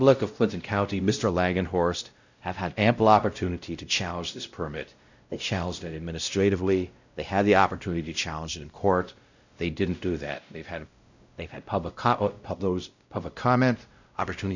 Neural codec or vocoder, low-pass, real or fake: codec, 16 kHz, 0.5 kbps, X-Codec, WavLM features, trained on Multilingual LibriSpeech; 7.2 kHz; fake